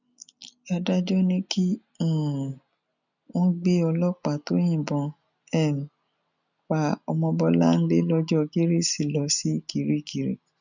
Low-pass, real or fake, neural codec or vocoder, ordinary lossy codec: 7.2 kHz; real; none; none